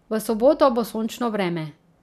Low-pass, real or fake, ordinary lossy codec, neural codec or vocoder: 14.4 kHz; real; none; none